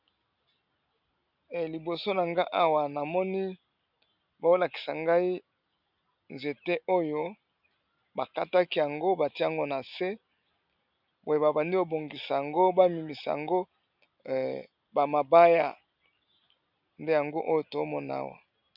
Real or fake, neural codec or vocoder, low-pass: real; none; 5.4 kHz